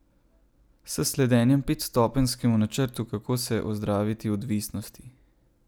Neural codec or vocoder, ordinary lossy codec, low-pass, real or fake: none; none; none; real